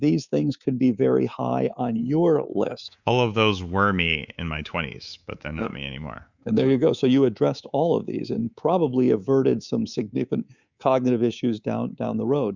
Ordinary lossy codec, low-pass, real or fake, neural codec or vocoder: Opus, 64 kbps; 7.2 kHz; fake; codec, 24 kHz, 3.1 kbps, DualCodec